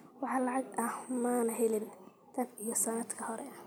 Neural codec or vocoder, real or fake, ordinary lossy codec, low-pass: none; real; none; none